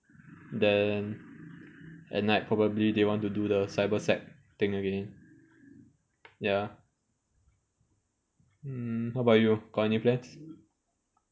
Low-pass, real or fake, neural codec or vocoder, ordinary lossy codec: none; real; none; none